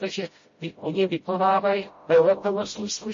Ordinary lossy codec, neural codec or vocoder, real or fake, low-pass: MP3, 32 kbps; codec, 16 kHz, 0.5 kbps, FreqCodec, smaller model; fake; 7.2 kHz